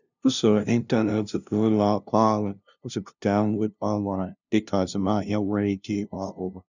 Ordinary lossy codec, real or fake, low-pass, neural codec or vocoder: none; fake; 7.2 kHz; codec, 16 kHz, 0.5 kbps, FunCodec, trained on LibriTTS, 25 frames a second